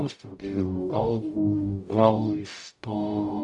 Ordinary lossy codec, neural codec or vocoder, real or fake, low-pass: AAC, 64 kbps; codec, 44.1 kHz, 0.9 kbps, DAC; fake; 10.8 kHz